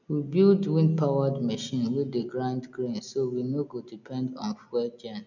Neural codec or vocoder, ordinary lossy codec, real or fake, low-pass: none; none; real; 7.2 kHz